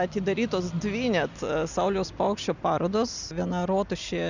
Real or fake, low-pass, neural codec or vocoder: real; 7.2 kHz; none